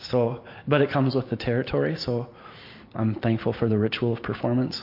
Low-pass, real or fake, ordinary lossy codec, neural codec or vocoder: 5.4 kHz; real; MP3, 32 kbps; none